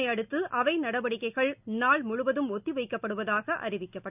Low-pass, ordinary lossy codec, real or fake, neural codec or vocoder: 3.6 kHz; none; real; none